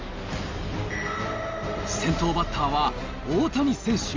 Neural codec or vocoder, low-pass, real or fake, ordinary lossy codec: none; 7.2 kHz; real; Opus, 32 kbps